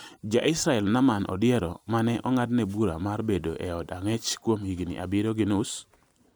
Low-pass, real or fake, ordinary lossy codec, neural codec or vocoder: none; real; none; none